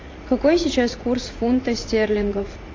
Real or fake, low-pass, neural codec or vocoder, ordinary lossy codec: fake; 7.2 kHz; vocoder, 44.1 kHz, 80 mel bands, Vocos; AAC, 32 kbps